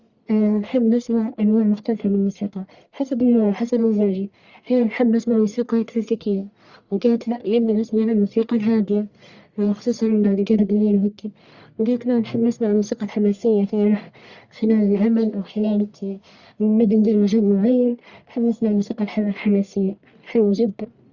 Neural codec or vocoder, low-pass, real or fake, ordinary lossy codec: codec, 44.1 kHz, 1.7 kbps, Pupu-Codec; 7.2 kHz; fake; Opus, 64 kbps